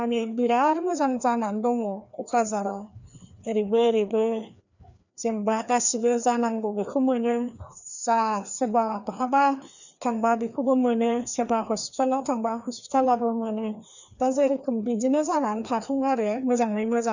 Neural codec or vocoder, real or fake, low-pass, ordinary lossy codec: codec, 16 kHz, 2 kbps, FreqCodec, larger model; fake; 7.2 kHz; none